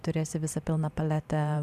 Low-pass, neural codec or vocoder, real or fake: 14.4 kHz; vocoder, 44.1 kHz, 128 mel bands every 512 samples, BigVGAN v2; fake